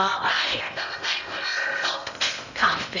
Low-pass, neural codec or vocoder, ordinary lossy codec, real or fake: 7.2 kHz; codec, 16 kHz in and 24 kHz out, 0.8 kbps, FocalCodec, streaming, 65536 codes; none; fake